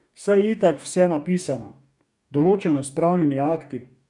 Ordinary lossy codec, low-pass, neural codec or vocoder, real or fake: none; 10.8 kHz; codec, 44.1 kHz, 2.6 kbps, DAC; fake